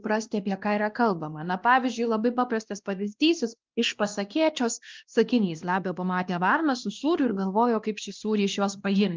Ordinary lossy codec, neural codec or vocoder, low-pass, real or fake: Opus, 32 kbps; codec, 16 kHz, 1 kbps, X-Codec, WavLM features, trained on Multilingual LibriSpeech; 7.2 kHz; fake